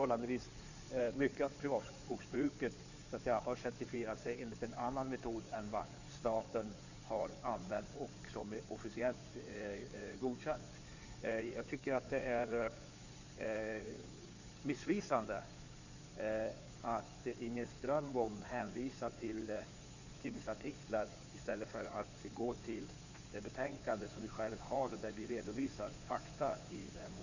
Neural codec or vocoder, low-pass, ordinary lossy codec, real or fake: codec, 16 kHz in and 24 kHz out, 2.2 kbps, FireRedTTS-2 codec; 7.2 kHz; none; fake